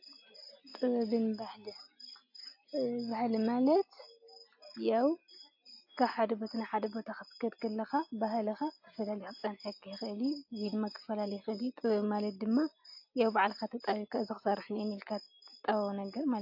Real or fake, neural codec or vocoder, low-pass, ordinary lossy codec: real; none; 5.4 kHz; AAC, 48 kbps